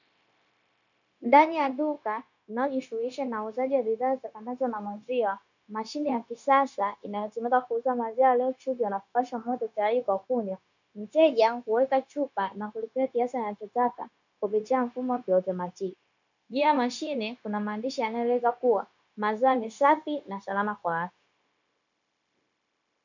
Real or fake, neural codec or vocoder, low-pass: fake; codec, 16 kHz, 0.9 kbps, LongCat-Audio-Codec; 7.2 kHz